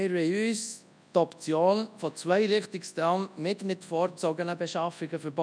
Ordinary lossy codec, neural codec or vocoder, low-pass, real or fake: none; codec, 24 kHz, 0.9 kbps, WavTokenizer, large speech release; 9.9 kHz; fake